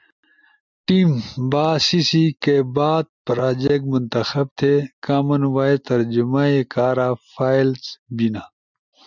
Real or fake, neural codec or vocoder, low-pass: real; none; 7.2 kHz